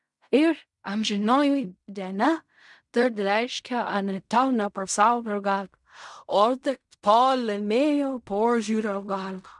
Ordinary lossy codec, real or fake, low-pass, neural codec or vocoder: MP3, 96 kbps; fake; 10.8 kHz; codec, 16 kHz in and 24 kHz out, 0.4 kbps, LongCat-Audio-Codec, fine tuned four codebook decoder